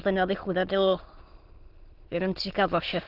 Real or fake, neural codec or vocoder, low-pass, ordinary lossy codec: fake; autoencoder, 22.05 kHz, a latent of 192 numbers a frame, VITS, trained on many speakers; 5.4 kHz; Opus, 16 kbps